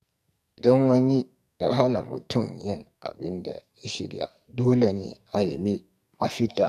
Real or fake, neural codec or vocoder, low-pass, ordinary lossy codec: fake; codec, 44.1 kHz, 2.6 kbps, SNAC; 14.4 kHz; none